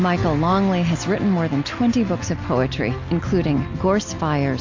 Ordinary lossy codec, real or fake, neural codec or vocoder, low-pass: AAC, 48 kbps; real; none; 7.2 kHz